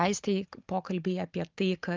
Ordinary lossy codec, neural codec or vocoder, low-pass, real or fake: Opus, 32 kbps; vocoder, 44.1 kHz, 128 mel bands every 512 samples, BigVGAN v2; 7.2 kHz; fake